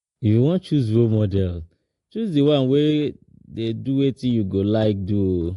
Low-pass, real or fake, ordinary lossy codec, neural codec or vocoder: 10.8 kHz; real; AAC, 48 kbps; none